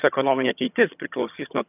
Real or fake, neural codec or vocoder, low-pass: fake; vocoder, 22.05 kHz, 80 mel bands, HiFi-GAN; 3.6 kHz